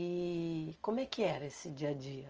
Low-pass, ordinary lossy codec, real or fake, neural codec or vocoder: 7.2 kHz; Opus, 24 kbps; real; none